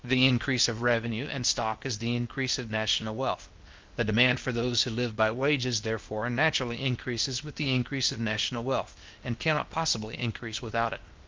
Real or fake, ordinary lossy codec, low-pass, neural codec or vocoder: fake; Opus, 32 kbps; 7.2 kHz; codec, 16 kHz, 0.8 kbps, ZipCodec